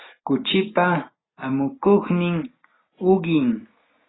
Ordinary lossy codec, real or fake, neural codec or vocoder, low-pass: AAC, 16 kbps; real; none; 7.2 kHz